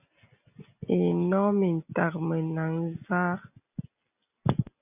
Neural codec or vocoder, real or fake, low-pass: none; real; 3.6 kHz